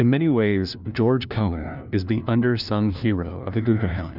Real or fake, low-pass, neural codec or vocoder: fake; 5.4 kHz; codec, 16 kHz, 1 kbps, FunCodec, trained on LibriTTS, 50 frames a second